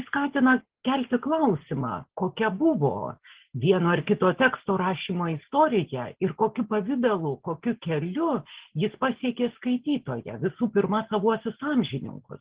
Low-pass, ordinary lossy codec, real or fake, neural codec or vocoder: 3.6 kHz; Opus, 16 kbps; real; none